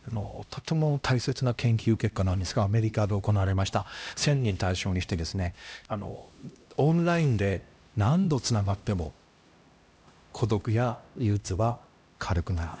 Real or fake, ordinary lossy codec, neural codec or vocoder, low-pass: fake; none; codec, 16 kHz, 1 kbps, X-Codec, HuBERT features, trained on LibriSpeech; none